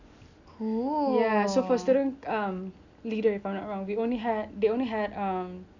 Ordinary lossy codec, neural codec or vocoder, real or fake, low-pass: none; none; real; 7.2 kHz